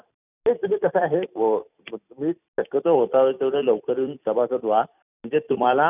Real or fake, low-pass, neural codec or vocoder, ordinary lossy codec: fake; 3.6 kHz; vocoder, 44.1 kHz, 128 mel bands every 256 samples, BigVGAN v2; none